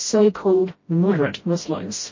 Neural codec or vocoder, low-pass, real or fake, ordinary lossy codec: codec, 16 kHz, 0.5 kbps, FreqCodec, smaller model; 7.2 kHz; fake; MP3, 32 kbps